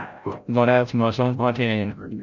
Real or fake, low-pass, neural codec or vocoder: fake; 7.2 kHz; codec, 16 kHz, 0.5 kbps, FreqCodec, larger model